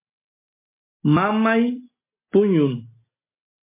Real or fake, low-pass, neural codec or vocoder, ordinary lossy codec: real; 3.6 kHz; none; MP3, 24 kbps